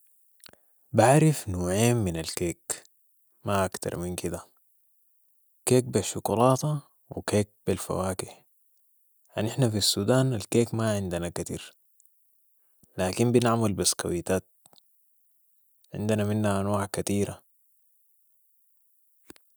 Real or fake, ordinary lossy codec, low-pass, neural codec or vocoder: real; none; none; none